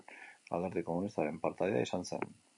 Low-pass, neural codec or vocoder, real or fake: 10.8 kHz; none; real